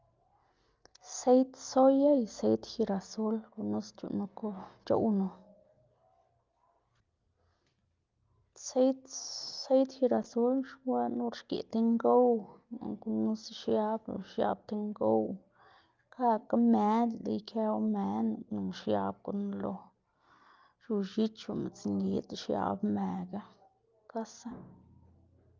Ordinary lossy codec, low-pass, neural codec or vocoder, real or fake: Opus, 24 kbps; 7.2 kHz; none; real